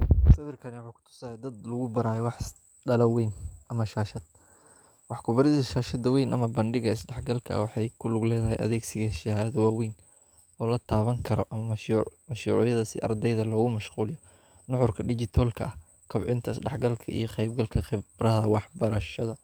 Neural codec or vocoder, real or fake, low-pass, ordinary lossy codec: codec, 44.1 kHz, 7.8 kbps, DAC; fake; none; none